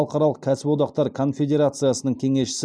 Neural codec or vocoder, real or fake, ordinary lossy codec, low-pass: none; real; none; none